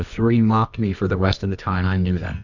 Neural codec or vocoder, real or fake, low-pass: codec, 24 kHz, 0.9 kbps, WavTokenizer, medium music audio release; fake; 7.2 kHz